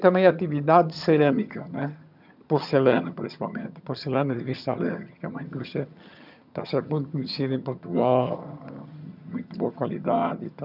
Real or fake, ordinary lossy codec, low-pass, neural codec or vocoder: fake; none; 5.4 kHz; vocoder, 22.05 kHz, 80 mel bands, HiFi-GAN